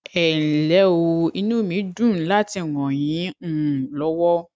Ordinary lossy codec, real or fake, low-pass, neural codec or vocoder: none; real; none; none